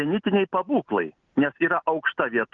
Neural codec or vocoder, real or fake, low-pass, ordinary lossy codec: none; real; 7.2 kHz; Opus, 24 kbps